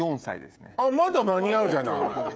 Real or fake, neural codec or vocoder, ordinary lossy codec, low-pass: fake; codec, 16 kHz, 16 kbps, FreqCodec, smaller model; none; none